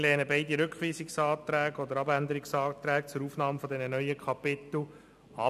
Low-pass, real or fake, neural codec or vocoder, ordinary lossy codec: 14.4 kHz; real; none; none